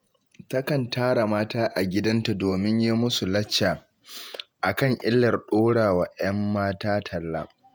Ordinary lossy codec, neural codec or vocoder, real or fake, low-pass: none; vocoder, 48 kHz, 128 mel bands, Vocos; fake; none